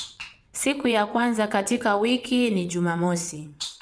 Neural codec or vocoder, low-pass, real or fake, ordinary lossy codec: vocoder, 22.05 kHz, 80 mel bands, WaveNeXt; none; fake; none